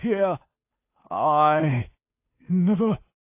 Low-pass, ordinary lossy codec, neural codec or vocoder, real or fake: 3.6 kHz; AAC, 32 kbps; codec, 16 kHz, 2 kbps, FunCodec, trained on Chinese and English, 25 frames a second; fake